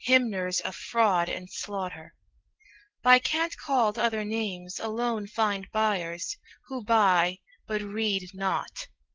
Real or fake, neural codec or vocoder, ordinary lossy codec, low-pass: real; none; Opus, 16 kbps; 7.2 kHz